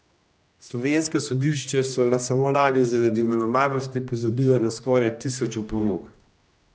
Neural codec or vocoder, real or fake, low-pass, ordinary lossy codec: codec, 16 kHz, 1 kbps, X-Codec, HuBERT features, trained on general audio; fake; none; none